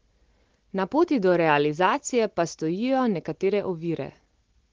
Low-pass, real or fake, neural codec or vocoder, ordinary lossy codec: 7.2 kHz; real; none; Opus, 16 kbps